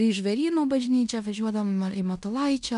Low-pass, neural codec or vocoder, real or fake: 10.8 kHz; codec, 16 kHz in and 24 kHz out, 0.9 kbps, LongCat-Audio-Codec, fine tuned four codebook decoder; fake